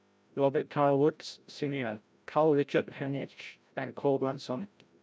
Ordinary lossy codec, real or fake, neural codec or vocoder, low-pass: none; fake; codec, 16 kHz, 0.5 kbps, FreqCodec, larger model; none